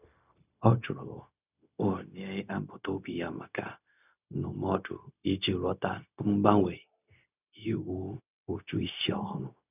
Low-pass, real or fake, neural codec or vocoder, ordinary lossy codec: 3.6 kHz; fake; codec, 16 kHz, 0.4 kbps, LongCat-Audio-Codec; none